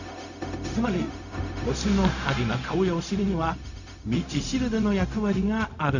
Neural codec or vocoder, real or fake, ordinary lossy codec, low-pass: codec, 16 kHz, 0.4 kbps, LongCat-Audio-Codec; fake; none; 7.2 kHz